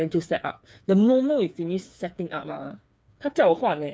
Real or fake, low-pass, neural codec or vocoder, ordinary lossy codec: fake; none; codec, 16 kHz, 4 kbps, FreqCodec, smaller model; none